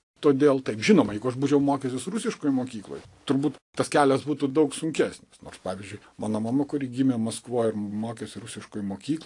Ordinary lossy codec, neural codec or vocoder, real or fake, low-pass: AAC, 48 kbps; none; real; 10.8 kHz